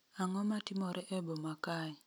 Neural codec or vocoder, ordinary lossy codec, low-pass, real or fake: none; none; none; real